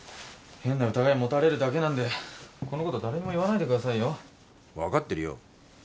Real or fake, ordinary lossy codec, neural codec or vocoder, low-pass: real; none; none; none